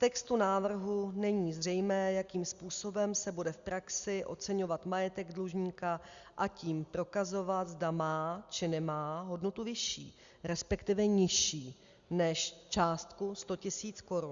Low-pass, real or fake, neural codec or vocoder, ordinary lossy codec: 7.2 kHz; real; none; Opus, 64 kbps